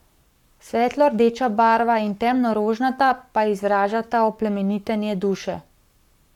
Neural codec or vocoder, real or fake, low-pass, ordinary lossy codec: codec, 44.1 kHz, 7.8 kbps, Pupu-Codec; fake; 19.8 kHz; none